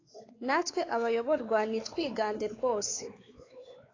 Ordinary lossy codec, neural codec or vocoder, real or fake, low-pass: AAC, 32 kbps; codec, 16 kHz, 4 kbps, X-Codec, WavLM features, trained on Multilingual LibriSpeech; fake; 7.2 kHz